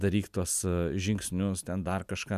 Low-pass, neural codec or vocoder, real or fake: 14.4 kHz; none; real